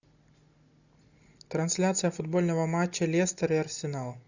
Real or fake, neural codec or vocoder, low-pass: real; none; 7.2 kHz